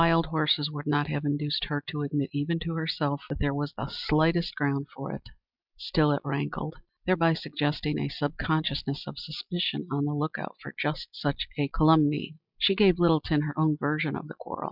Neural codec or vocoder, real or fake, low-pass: none; real; 5.4 kHz